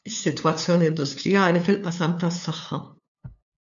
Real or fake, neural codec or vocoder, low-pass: fake; codec, 16 kHz, 2 kbps, FunCodec, trained on LibriTTS, 25 frames a second; 7.2 kHz